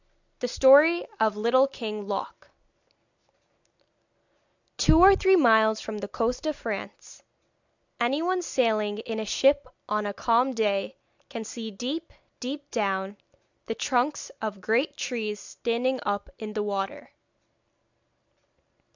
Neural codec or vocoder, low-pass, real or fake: none; 7.2 kHz; real